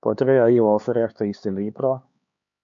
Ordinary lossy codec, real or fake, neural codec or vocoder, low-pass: MP3, 64 kbps; fake; codec, 16 kHz, 4 kbps, X-Codec, HuBERT features, trained on LibriSpeech; 7.2 kHz